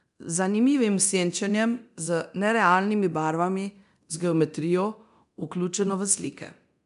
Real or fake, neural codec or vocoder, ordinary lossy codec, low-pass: fake; codec, 24 kHz, 0.9 kbps, DualCodec; none; 10.8 kHz